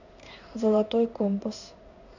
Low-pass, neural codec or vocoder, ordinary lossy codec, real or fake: 7.2 kHz; codec, 16 kHz in and 24 kHz out, 1 kbps, XY-Tokenizer; none; fake